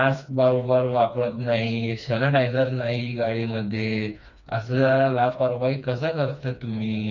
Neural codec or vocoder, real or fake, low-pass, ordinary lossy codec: codec, 16 kHz, 2 kbps, FreqCodec, smaller model; fake; 7.2 kHz; none